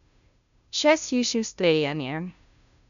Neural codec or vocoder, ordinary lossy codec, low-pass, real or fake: codec, 16 kHz, 0.5 kbps, FunCodec, trained on Chinese and English, 25 frames a second; none; 7.2 kHz; fake